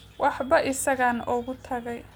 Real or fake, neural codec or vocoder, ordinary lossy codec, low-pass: real; none; none; none